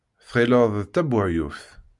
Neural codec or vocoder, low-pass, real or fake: none; 10.8 kHz; real